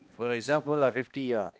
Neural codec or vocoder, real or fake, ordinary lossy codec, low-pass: codec, 16 kHz, 1 kbps, X-Codec, HuBERT features, trained on balanced general audio; fake; none; none